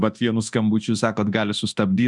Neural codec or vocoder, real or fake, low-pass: codec, 24 kHz, 0.9 kbps, DualCodec; fake; 10.8 kHz